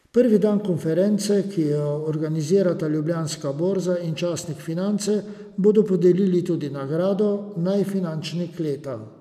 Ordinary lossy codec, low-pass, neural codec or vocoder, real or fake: none; 14.4 kHz; none; real